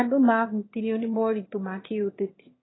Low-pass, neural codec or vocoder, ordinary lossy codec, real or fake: 7.2 kHz; autoencoder, 22.05 kHz, a latent of 192 numbers a frame, VITS, trained on one speaker; AAC, 16 kbps; fake